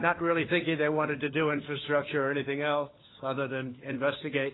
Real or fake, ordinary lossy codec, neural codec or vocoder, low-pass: fake; AAC, 16 kbps; codec, 16 kHz, 4 kbps, FunCodec, trained on LibriTTS, 50 frames a second; 7.2 kHz